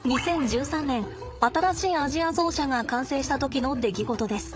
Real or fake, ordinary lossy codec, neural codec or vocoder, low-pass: fake; none; codec, 16 kHz, 8 kbps, FreqCodec, larger model; none